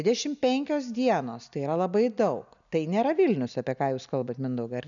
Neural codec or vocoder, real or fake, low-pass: none; real; 7.2 kHz